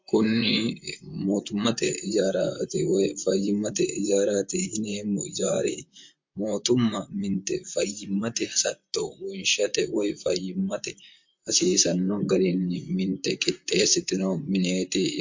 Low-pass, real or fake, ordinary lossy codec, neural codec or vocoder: 7.2 kHz; fake; MP3, 48 kbps; vocoder, 44.1 kHz, 128 mel bands, Pupu-Vocoder